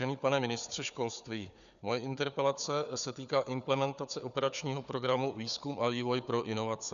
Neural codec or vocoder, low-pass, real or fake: codec, 16 kHz, 4 kbps, FunCodec, trained on Chinese and English, 50 frames a second; 7.2 kHz; fake